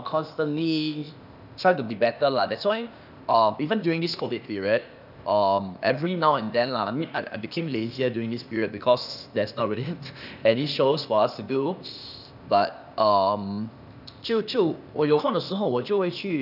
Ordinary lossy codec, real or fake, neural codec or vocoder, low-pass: none; fake; codec, 16 kHz, 0.8 kbps, ZipCodec; 5.4 kHz